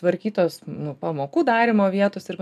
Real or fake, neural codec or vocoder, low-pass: real; none; 14.4 kHz